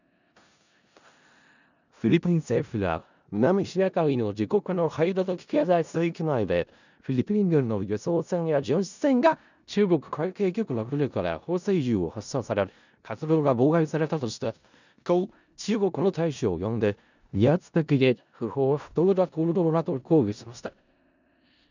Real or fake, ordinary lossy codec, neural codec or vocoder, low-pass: fake; none; codec, 16 kHz in and 24 kHz out, 0.4 kbps, LongCat-Audio-Codec, four codebook decoder; 7.2 kHz